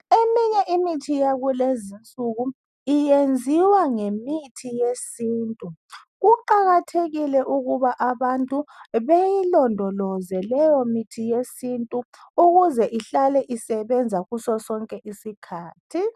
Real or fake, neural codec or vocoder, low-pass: real; none; 14.4 kHz